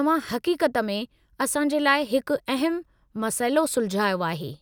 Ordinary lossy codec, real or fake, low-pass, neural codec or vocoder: none; real; none; none